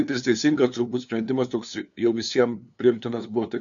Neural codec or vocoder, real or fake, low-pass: codec, 16 kHz, 2 kbps, FunCodec, trained on LibriTTS, 25 frames a second; fake; 7.2 kHz